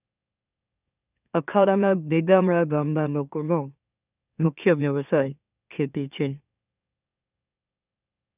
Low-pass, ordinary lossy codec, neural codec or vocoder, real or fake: 3.6 kHz; none; autoencoder, 44.1 kHz, a latent of 192 numbers a frame, MeloTTS; fake